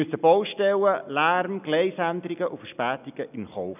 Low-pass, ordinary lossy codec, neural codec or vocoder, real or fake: 3.6 kHz; none; none; real